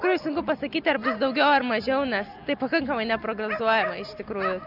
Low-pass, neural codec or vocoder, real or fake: 5.4 kHz; none; real